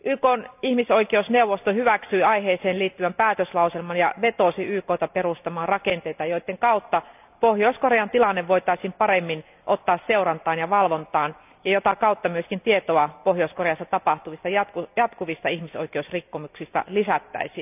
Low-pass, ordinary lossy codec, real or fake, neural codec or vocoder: 3.6 kHz; none; real; none